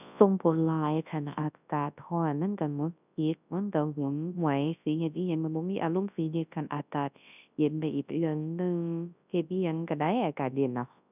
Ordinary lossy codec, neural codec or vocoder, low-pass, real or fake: none; codec, 24 kHz, 0.9 kbps, WavTokenizer, large speech release; 3.6 kHz; fake